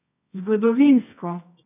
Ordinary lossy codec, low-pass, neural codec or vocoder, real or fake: none; 3.6 kHz; codec, 24 kHz, 0.9 kbps, WavTokenizer, medium music audio release; fake